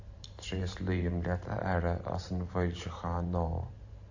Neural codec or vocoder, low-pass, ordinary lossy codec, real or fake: vocoder, 44.1 kHz, 128 mel bands every 512 samples, BigVGAN v2; 7.2 kHz; AAC, 48 kbps; fake